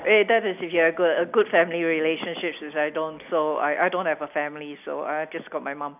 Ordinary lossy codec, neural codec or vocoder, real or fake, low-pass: none; none; real; 3.6 kHz